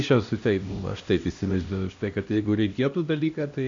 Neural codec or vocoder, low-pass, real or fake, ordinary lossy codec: codec, 16 kHz, 1 kbps, X-Codec, HuBERT features, trained on LibriSpeech; 7.2 kHz; fake; AAC, 48 kbps